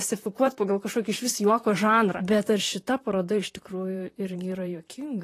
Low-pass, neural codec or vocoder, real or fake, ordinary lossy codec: 14.4 kHz; vocoder, 44.1 kHz, 128 mel bands, Pupu-Vocoder; fake; AAC, 48 kbps